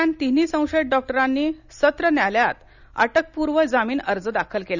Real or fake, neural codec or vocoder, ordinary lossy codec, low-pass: real; none; none; 7.2 kHz